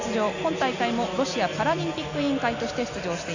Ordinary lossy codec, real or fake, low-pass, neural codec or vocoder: none; real; 7.2 kHz; none